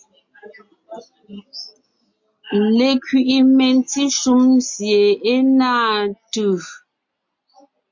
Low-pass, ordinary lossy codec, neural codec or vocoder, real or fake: 7.2 kHz; MP3, 48 kbps; none; real